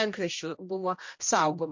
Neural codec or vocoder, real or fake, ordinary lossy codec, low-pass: codec, 16 kHz, 1 kbps, X-Codec, HuBERT features, trained on general audio; fake; MP3, 48 kbps; 7.2 kHz